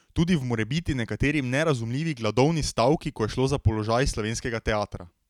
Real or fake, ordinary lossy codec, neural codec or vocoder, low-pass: real; none; none; 19.8 kHz